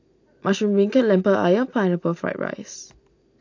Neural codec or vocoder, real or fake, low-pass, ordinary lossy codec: none; real; 7.2 kHz; AAC, 48 kbps